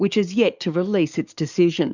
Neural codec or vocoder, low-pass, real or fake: codec, 44.1 kHz, 7.8 kbps, DAC; 7.2 kHz; fake